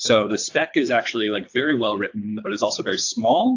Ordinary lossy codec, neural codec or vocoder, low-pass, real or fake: AAC, 48 kbps; codec, 24 kHz, 3 kbps, HILCodec; 7.2 kHz; fake